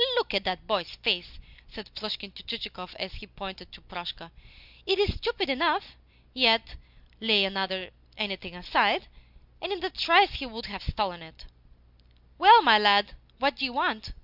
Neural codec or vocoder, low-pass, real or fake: none; 5.4 kHz; real